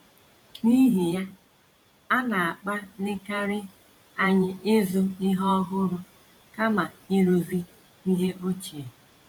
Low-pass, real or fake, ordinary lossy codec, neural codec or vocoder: 19.8 kHz; fake; none; vocoder, 44.1 kHz, 128 mel bands every 512 samples, BigVGAN v2